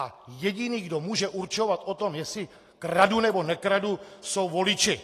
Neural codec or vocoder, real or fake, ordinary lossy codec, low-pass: none; real; AAC, 48 kbps; 14.4 kHz